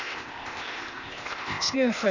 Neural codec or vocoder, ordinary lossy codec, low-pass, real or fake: codec, 16 kHz, 0.8 kbps, ZipCodec; none; 7.2 kHz; fake